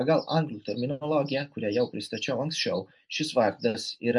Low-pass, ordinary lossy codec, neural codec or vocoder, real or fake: 10.8 kHz; MP3, 96 kbps; none; real